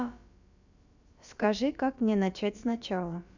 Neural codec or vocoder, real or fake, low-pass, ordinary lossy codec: codec, 16 kHz, about 1 kbps, DyCAST, with the encoder's durations; fake; 7.2 kHz; none